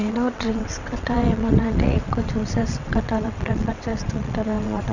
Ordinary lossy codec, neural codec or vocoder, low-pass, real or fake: none; vocoder, 22.05 kHz, 80 mel bands, WaveNeXt; 7.2 kHz; fake